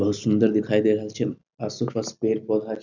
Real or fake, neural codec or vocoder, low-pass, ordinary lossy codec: real; none; 7.2 kHz; none